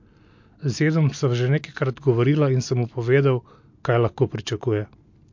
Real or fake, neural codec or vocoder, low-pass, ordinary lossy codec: real; none; 7.2 kHz; MP3, 48 kbps